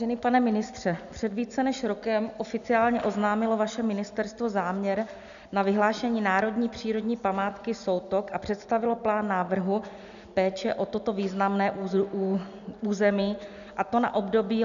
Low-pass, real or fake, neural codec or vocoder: 7.2 kHz; real; none